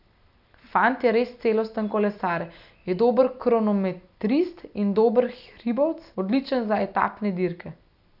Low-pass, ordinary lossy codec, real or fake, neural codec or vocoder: 5.4 kHz; none; real; none